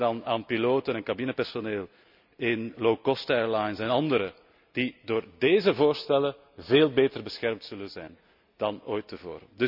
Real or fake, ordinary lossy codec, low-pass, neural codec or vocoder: real; none; 5.4 kHz; none